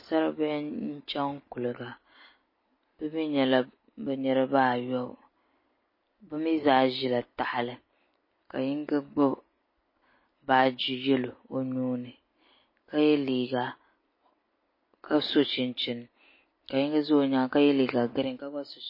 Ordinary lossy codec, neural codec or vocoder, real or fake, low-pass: MP3, 24 kbps; none; real; 5.4 kHz